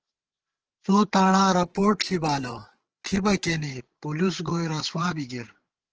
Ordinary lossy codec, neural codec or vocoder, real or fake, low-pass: Opus, 16 kbps; codec, 16 kHz, 8 kbps, FreqCodec, larger model; fake; 7.2 kHz